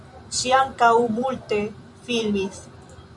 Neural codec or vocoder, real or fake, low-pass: vocoder, 44.1 kHz, 128 mel bands every 256 samples, BigVGAN v2; fake; 10.8 kHz